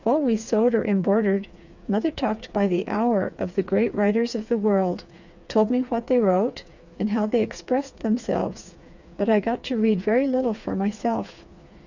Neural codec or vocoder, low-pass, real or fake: codec, 16 kHz, 4 kbps, FreqCodec, smaller model; 7.2 kHz; fake